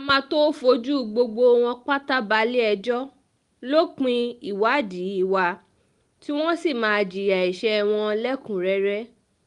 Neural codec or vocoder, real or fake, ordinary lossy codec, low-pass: none; real; Opus, 32 kbps; 10.8 kHz